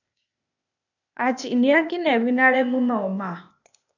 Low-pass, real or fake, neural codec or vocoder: 7.2 kHz; fake; codec, 16 kHz, 0.8 kbps, ZipCodec